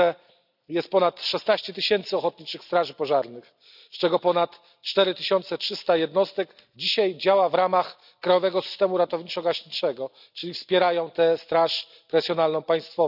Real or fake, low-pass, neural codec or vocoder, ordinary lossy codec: real; 5.4 kHz; none; none